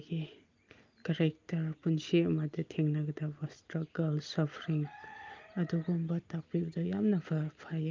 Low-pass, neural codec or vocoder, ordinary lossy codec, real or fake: 7.2 kHz; none; Opus, 24 kbps; real